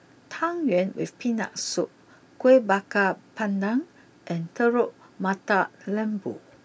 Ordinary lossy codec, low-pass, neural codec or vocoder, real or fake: none; none; none; real